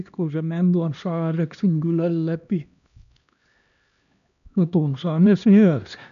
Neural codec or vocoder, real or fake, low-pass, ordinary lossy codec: codec, 16 kHz, 1 kbps, X-Codec, HuBERT features, trained on LibriSpeech; fake; 7.2 kHz; none